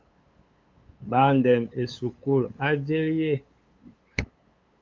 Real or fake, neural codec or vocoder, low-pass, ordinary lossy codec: fake; codec, 16 kHz, 8 kbps, FunCodec, trained on LibriTTS, 25 frames a second; 7.2 kHz; Opus, 24 kbps